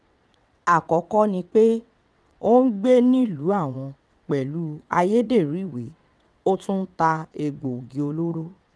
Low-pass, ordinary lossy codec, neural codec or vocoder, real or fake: none; none; vocoder, 22.05 kHz, 80 mel bands, WaveNeXt; fake